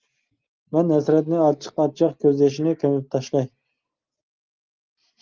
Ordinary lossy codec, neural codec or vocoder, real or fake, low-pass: Opus, 32 kbps; none; real; 7.2 kHz